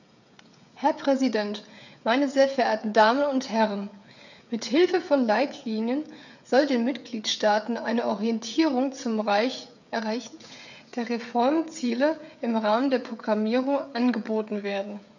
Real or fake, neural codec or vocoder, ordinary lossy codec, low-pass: fake; codec, 16 kHz, 16 kbps, FreqCodec, smaller model; none; 7.2 kHz